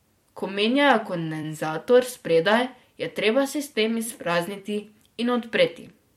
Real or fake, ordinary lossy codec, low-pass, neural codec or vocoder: fake; MP3, 64 kbps; 19.8 kHz; vocoder, 44.1 kHz, 128 mel bands every 256 samples, BigVGAN v2